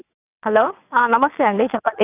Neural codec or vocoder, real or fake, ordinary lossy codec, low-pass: vocoder, 22.05 kHz, 80 mel bands, WaveNeXt; fake; none; 3.6 kHz